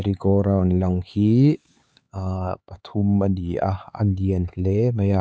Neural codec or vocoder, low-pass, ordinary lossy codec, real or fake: codec, 16 kHz, 4 kbps, X-Codec, HuBERT features, trained on LibriSpeech; none; none; fake